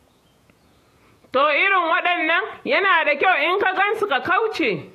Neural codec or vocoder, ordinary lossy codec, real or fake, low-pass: vocoder, 48 kHz, 128 mel bands, Vocos; AAC, 64 kbps; fake; 14.4 kHz